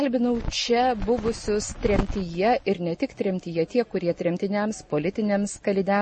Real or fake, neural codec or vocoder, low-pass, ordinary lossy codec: real; none; 10.8 kHz; MP3, 32 kbps